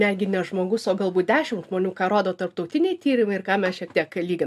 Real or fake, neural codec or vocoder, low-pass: real; none; 14.4 kHz